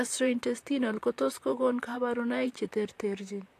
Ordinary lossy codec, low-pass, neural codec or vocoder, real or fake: AAC, 64 kbps; 14.4 kHz; vocoder, 44.1 kHz, 128 mel bands, Pupu-Vocoder; fake